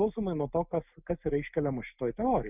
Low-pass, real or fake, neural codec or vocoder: 3.6 kHz; real; none